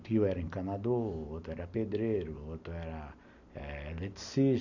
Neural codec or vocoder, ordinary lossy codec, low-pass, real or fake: none; none; 7.2 kHz; real